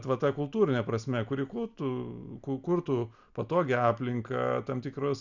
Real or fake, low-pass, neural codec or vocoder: real; 7.2 kHz; none